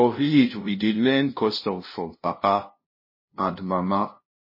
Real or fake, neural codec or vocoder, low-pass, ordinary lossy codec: fake; codec, 16 kHz, 0.5 kbps, FunCodec, trained on LibriTTS, 25 frames a second; 5.4 kHz; MP3, 24 kbps